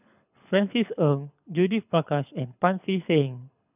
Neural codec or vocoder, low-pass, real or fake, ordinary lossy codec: codec, 24 kHz, 6 kbps, HILCodec; 3.6 kHz; fake; none